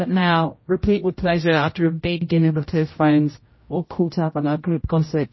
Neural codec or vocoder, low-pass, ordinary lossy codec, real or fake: codec, 16 kHz, 0.5 kbps, X-Codec, HuBERT features, trained on general audio; 7.2 kHz; MP3, 24 kbps; fake